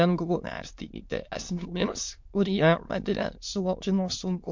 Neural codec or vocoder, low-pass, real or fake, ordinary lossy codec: autoencoder, 22.05 kHz, a latent of 192 numbers a frame, VITS, trained on many speakers; 7.2 kHz; fake; MP3, 48 kbps